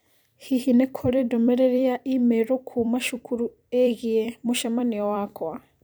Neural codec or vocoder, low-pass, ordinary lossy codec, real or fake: vocoder, 44.1 kHz, 128 mel bands every 256 samples, BigVGAN v2; none; none; fake